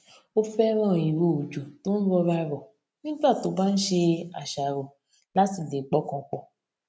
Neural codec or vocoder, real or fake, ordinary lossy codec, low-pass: none; real; none; none